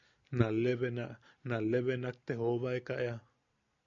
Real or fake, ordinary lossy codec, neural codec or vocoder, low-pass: real; MP3, 96 kbps; none; 7.2 kHz